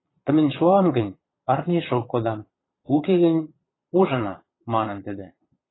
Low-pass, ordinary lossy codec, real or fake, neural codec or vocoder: 7.2 kHz; AAC, 16 kbps; fake; vocoder, 22.05 kHz, 80 mel bands, Vocos